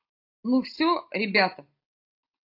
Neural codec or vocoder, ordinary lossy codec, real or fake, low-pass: none; AAC, 32 kbps; real; 5.4 kHz